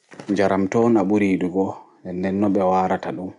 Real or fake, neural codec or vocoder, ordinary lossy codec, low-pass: real; none; MP3, 64 kbps; 10.8 kHz